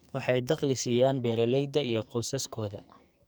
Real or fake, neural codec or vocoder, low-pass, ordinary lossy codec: fake; codec, 44.1 kHz, 2.6 kbps, SNAC; none; none